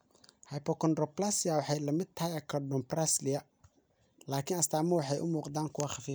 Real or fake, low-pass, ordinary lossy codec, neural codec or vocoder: real; none; none; none